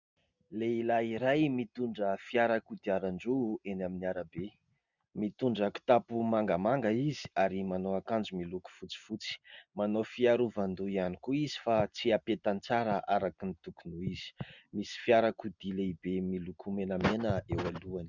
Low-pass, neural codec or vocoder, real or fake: 7.2 kHz; none; real